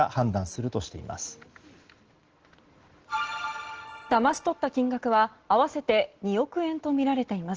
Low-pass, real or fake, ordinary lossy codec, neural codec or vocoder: 7.2 kHz; real; Opus, 16 kbps; none